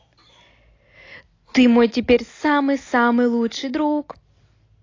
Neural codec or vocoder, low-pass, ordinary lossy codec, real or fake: none; 7.2 kHz; AAC, 32 kbps; real